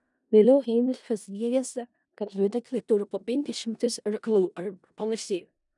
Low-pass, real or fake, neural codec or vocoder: 10.8 kHz; fake; codec, 16 kHz in and 24 kHz out, 0.4 kbps, LongCat-Audio-Codec, four codebook decoder